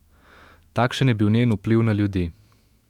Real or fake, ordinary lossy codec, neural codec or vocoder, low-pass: fake; Opus, 64 kbps; autoencoder, 48 kHz, 128 numbers a frame, DAC-VAE, trained on Japanese speech; 19.8 kHz